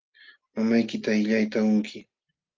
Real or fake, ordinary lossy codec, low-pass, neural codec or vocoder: real; Opus, 32 kbps; 7.2 kHz; none